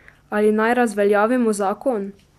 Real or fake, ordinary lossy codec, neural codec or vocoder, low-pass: real; none; none; 14.4 kHz